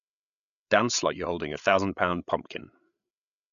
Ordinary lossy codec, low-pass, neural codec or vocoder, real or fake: none; 7.2 kHz; codec, 16 kHz, 8 kbps, FreqCodec, larger model; fake